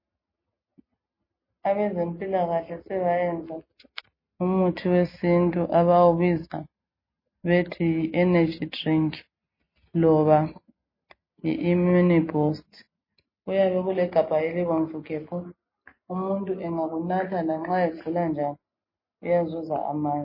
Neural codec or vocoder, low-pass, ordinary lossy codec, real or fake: none; 5.4 kHz; MP3, 24 kbps; real